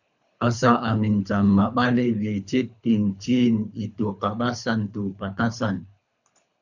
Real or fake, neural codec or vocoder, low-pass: fake; codec, 24 kHz, 3 kbps, HILCodec; 7.2 kHz